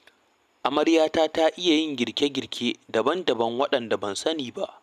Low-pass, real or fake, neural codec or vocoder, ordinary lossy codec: 14.4 kHz; real; none; none